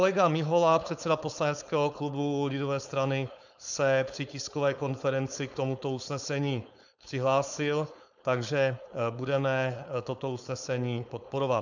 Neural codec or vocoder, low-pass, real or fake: codec, 16 kHz, 4.8 kbps, FACodec; 7.2 kHz; fake